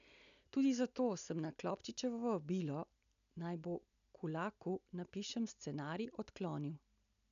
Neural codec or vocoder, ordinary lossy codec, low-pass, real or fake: none; none; 7.2 kHz; real